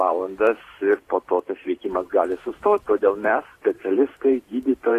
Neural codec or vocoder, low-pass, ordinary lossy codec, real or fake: vocoder, 44.1 kHz, 128 mel bands every 512 samples, BigVGAN v2; 14.4 kHz; AAC, 48 kbps; fake